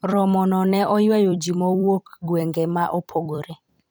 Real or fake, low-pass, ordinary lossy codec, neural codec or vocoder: fake; none; none; vocoder, 44.1 kHz, 128 mel bands every 256 samples, BigVGAN v2